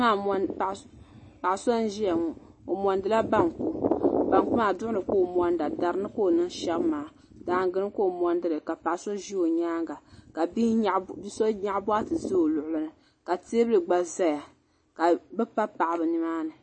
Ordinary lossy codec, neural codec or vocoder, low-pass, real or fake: MP3, 32 kbps; none; 9.9 kHz; real